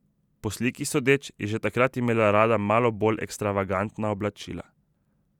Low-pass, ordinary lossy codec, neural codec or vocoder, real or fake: 19.8 kHz; none; vocoder, 44.1 kHz, 128 mel bands every 512 samples, BigVGAN v2; fake